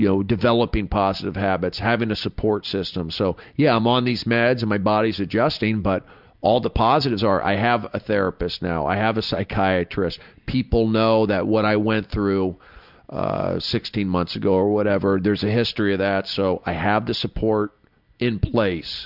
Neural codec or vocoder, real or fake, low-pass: none; real; 5.4 kHz